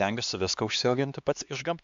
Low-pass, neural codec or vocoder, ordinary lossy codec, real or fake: 7.2 kHz; codec, 16 kHz, 2 kbps, X-Codec, HuBERT features, trained on LibriSpeech; MP3, 64 kbps; fake